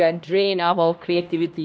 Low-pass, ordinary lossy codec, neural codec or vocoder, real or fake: none; none; codec, 16 kHz, 1 kbps, X-Codec, HuBERT features, trained on LibriSpeech; fake